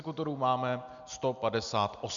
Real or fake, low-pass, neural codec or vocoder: real; 7.2 kHz; none